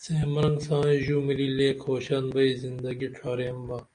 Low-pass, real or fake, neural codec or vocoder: 9.9 kHz; real; none